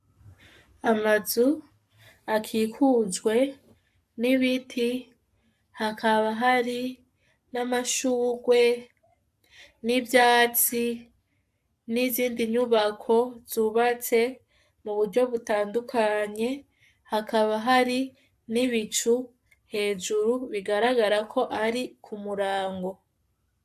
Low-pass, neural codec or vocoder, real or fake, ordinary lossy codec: 14.4 kHz; codec, 44.1 kHz, 7.8 kbps, Pupu-Codec; fake; AAC, 96 kbps